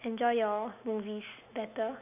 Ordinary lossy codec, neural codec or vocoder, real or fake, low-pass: none; none; real; 3.6 kHz